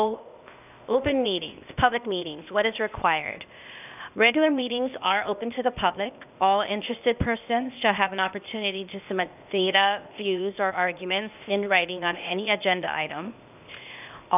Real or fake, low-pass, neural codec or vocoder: fake; 3.6 kHz; codec, 16 kHz, 0.8 kbps, ZipCodec